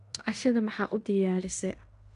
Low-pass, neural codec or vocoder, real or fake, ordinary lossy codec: 10.8 kHz; codec, 16 kHz in and 24 kHz out, 0.9 kbps, LongCat-Audio-Codec, fine tuned four codebook decoder; fake; none